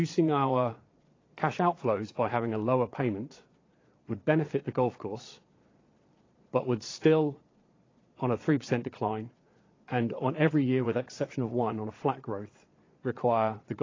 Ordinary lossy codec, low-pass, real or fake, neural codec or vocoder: AAC, 32 kbps; 7.2 kHz; fake; vocoder, 22.05 kHz, 80 mel bands, Vocos